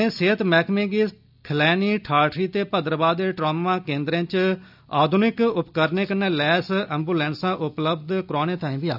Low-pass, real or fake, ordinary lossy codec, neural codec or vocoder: 5.4 kHz; real; none; none